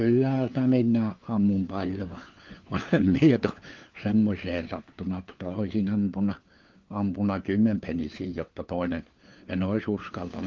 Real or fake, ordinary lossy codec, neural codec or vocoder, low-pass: fake; Opus, 32 kbps; codec, 16 kHz, 4 kbps, FunCodec, trained on LibriTTS, 50 frames a second; 7.2 kHz